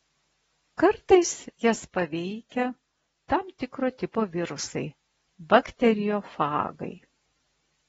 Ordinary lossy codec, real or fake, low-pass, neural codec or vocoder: AAC, 24 kbps; real; 19.8 kHz; none